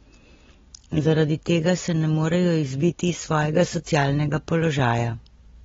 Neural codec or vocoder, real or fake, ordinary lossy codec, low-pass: none; real; AAC, 24 kbps; 7.2 kHz